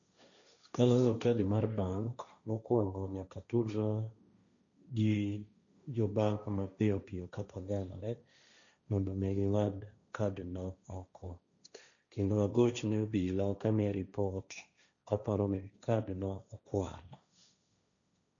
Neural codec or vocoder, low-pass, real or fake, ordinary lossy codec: codec, 16 kHz, 1.1 kbps, Voila-Tokenizer; 7.2 kHz; fake; none